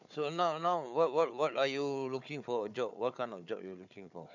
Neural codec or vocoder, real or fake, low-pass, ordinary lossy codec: codec, 16 kHz, 4 kbps, FunCodec, trained on Chinese and English, 50 frames a second; fake; 7.2 kHz; none